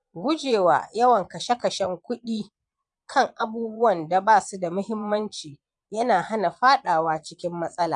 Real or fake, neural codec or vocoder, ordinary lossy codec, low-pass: fake; vocoder, 22.05 kHz, 80 mel bands, Vocos; none; 9.9 kHz